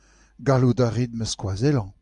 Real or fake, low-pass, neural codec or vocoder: real; 10.8 kHz; none